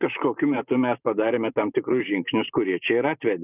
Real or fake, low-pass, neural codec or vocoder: fake; 3.6 kHz; vocoder, 44.1 kHz, 128 mel bands every 512 samples, BigVGAN v2